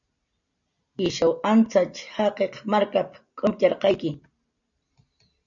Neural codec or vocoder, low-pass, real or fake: none; 7.2 kHz; real